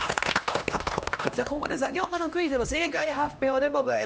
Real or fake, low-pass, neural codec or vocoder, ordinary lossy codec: fake; none; codec, 16 kHz, 1 kbps, X-Codec, HuBERT features, trained on LibriSpeech; none